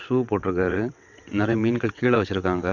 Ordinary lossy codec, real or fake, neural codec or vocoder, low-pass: none; fake; vocoder, 22.05 kHz, 80 mel bands, WaveNeXt; 7.2 kHz